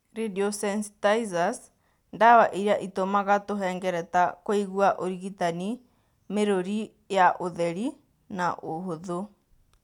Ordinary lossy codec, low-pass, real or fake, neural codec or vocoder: none; 19.8 kHz; real; none